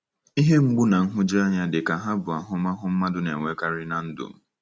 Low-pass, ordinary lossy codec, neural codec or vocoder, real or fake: none; none; none; real